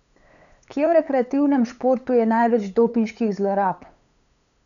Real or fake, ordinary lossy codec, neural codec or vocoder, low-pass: fake; none; codec, 16 kHz, 8 kbps, FunCodec, trained on LibriTTS, 25 frames a second; 7.2 kHz